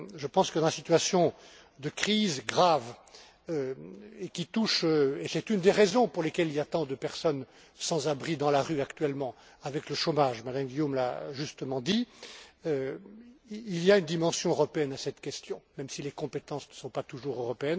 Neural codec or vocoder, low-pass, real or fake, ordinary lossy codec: none; none; real; none